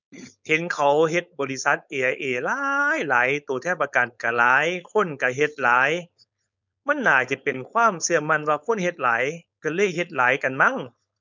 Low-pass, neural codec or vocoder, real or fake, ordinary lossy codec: 7.2 kHz; codec, 16 kHz, 4.8 kbps, FACodec; fake; none